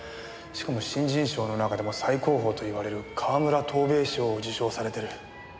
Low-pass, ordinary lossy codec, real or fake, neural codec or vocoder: none; none; real; none